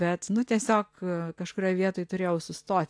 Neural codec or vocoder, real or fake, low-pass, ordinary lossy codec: none; real; 9.9 kHz; AAC, 64 kbps